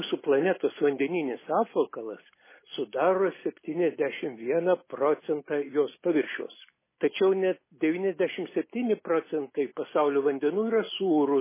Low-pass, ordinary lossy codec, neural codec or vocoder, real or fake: 3.6 kHz; MP3, 16 kbps; none; real